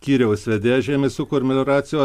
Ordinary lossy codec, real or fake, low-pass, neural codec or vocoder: MP3, 96 kbps; fake; 14.4 kHz; vocoder, 44.1 kHz, 128 mel bands every 256 samples, BigVGAN v2